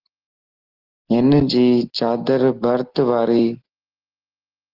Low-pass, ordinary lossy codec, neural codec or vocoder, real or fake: 5.4 kHz; Opus, 16 kbps; none; real